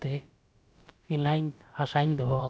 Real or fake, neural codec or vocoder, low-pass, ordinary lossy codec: fake; codec, 16 kHz, about 1 kbps, DyCAST, with the encoder's durations; none; none